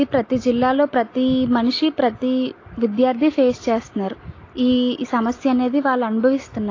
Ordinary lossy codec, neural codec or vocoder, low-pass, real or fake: AAC, 32 kbps; none; 7.2 kHz; real